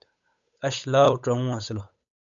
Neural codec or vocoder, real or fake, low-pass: codec, 16 kHz, 8 kbps, FunCodec, trained on Chinese and English, 25 frames a second; fake; 7.2 kHz